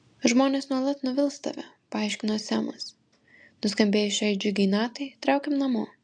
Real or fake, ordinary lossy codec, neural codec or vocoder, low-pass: real; AAC, 64 kbps; none; 9.9 kHz